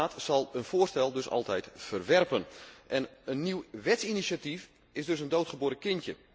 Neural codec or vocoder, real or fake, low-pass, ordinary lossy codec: none; real; none; none